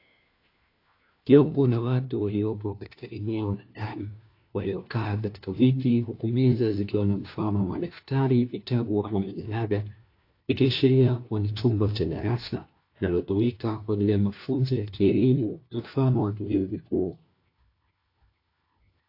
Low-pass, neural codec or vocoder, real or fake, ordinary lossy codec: 5.4 kHz; codec, 16 kHz, 1 kbps, FunCodec, trained on LibriTTS, 50 frames a second; fake; AAC, 32 kbps